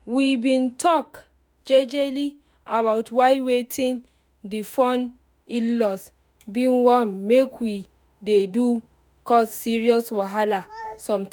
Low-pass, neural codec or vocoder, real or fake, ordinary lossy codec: none; autoencoder, 48 kHz, 32 numbers a frame, DAC-VAE, trained on Japanese speech; fake; none